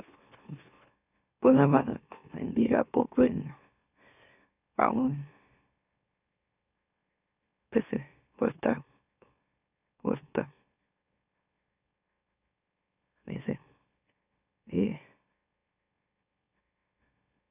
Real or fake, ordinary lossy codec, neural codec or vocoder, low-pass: fake; none; autoencoder, 44.1 kHz, a latent of 192 numbers a frame, MeloTTS; 3.6 kHz